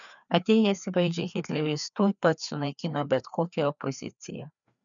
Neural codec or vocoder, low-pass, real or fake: codec, 16 kHz, 2 kbps, FreqCodec, larger model; 7.2 kHz; fake